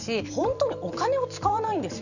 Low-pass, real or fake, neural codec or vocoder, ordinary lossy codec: 7.2 kHz; fake; vocoder, 44.1 kHz, 128 mel bands every 512 samples, BigVGAN v2; none